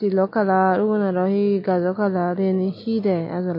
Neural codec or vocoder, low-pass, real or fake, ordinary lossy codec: none; 5.4 kHz; real; MP3, 32 kbps